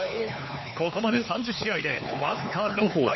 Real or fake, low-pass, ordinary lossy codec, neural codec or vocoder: fake; 7.2 kHz; MP3, 24 kbps; codec, 16 kHz, 4 kbps, X-Codec, HuBERT features, trained on LibriSpeech